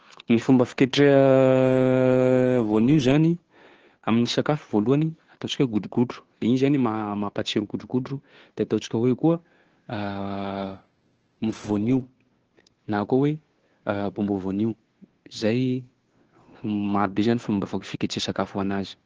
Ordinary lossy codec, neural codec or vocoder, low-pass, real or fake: Opus, 16 kbps; codec, 16 kHz, 2 kbps, FunCodec, trained on Chinese and English, 25 frames a second; 7.2 kHz; fake